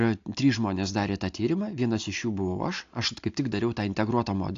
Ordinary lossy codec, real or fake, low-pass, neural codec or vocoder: AAC, 48 kbps; real; 7.2 kHz; none